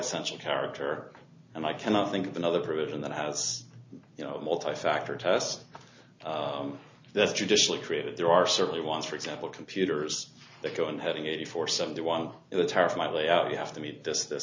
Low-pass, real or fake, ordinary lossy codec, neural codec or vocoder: 7.2 kHz; real; MP3, 64 kbps; none